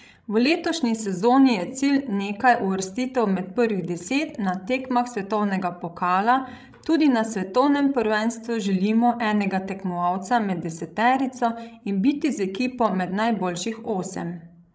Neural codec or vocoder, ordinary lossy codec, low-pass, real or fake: codec, 16 kHz, 16 kbps, FreqCodec, larger model; none; none; fake